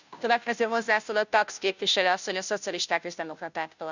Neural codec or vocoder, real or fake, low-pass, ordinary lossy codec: codec, 16 kHz, 0.5 kbps, FunCodec, trained on Chinese and English, 25 frames a second; fake; 7.2 kHz; none